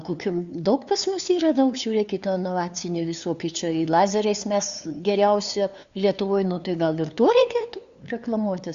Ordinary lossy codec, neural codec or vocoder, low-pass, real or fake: Opus, 64 kbps; codec, 16 kHz, 2 kbps, FunCodec, trained on LibriTTS, 25 frames a second; 7.2 kHz; fake